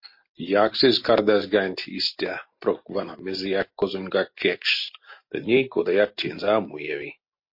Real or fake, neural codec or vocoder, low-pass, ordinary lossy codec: real; none; 5.4 kHz; MP3, 32 kbps